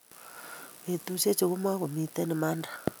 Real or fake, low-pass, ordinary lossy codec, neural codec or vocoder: real; none; none; none